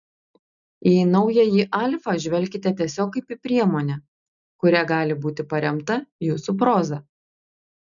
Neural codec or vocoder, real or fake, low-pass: none; real; 7.2 kHz